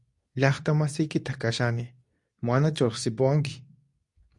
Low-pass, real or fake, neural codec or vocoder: 10.8 kHz; fake; codec, 24 kHz, 0.9 kbps, WavTokenizer, medium speech release version 2